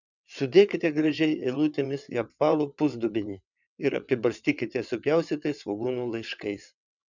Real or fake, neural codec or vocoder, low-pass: fake; vocoder, 22.05 kHz, 80 mel bands, WaveNeXt; 7.2 kHz